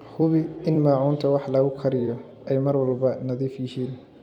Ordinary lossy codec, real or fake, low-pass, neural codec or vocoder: none; fake; 19.8 kHz; vocoder, 44.1 kHz, 128 mel bands every 256 samples, BigVGAN v2